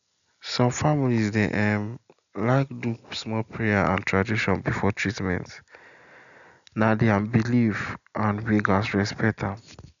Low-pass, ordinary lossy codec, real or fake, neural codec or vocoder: 7.2 kHz; none; real; none